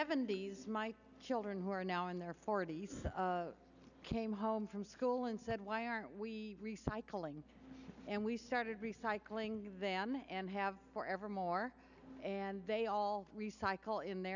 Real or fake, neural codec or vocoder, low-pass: real; none; 7.2 kHz